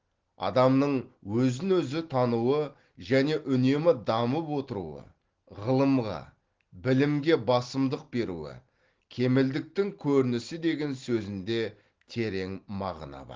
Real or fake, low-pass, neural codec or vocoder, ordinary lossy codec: real; 7.2 kHz; none; Opus, 16 kbps